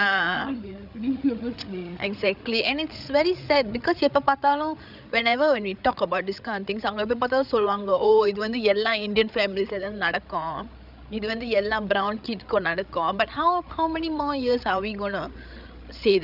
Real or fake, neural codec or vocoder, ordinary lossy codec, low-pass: fake; codec, 16 kHz, 8 kbps, FreqCodec, larger model; none; 5.4 kHz